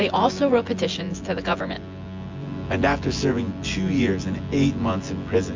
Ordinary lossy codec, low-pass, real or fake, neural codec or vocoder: MP3, 64 kbps; 7.2 kHz; fake; vocoder, 24 kHz, 100 mel bands, Vocos